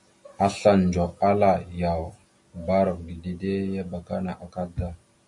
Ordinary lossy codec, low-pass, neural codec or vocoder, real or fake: AAC, 64 kbps; 10.8 kHz; none; real